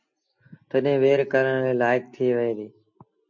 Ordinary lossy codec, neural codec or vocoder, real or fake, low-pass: MP3, 48 kbps; none; real; 7.2 kHz